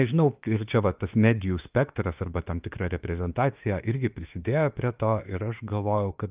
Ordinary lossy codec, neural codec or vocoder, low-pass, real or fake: Opus, 24 kbps; autoencoder, 48 kHz, 32 numbers a frame, DAC-VAE, trained on Japanese speech; 3.6 kHz; fake